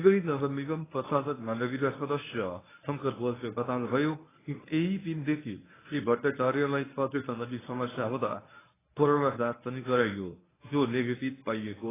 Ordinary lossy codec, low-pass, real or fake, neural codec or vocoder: AAC, 16 kbps; 3.6 kHz; fake; codec, 24 kHz, 0.9 kbps, WavTokenizer, medium speech release version 1